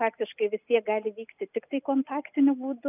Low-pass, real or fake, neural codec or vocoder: 3.6 kHz; real; none